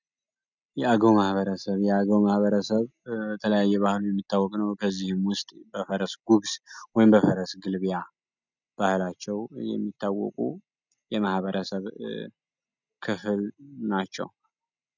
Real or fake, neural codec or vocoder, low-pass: real; none; 7.2 kHz